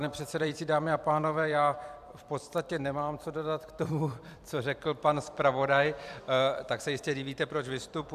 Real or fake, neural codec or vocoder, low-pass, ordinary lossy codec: fake; vocoder, 44.1 kHz, 128 mel bands every 256 samples, BigVGAN v2; 14.4 kHz; AAC, 96 kbps